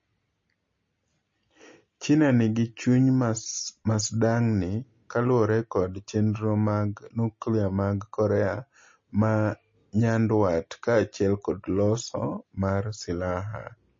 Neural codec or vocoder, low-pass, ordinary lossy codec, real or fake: none; 7.2 kHz; MP3, 32 kbps; real